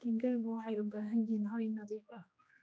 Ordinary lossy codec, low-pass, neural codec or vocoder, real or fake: none; none; codec, 16 kHz, 2 kbps, X-Codec, HuBERT features, trained on general audio; fake